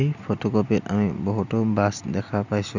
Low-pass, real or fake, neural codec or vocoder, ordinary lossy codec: 7.2 kHz; real; none; none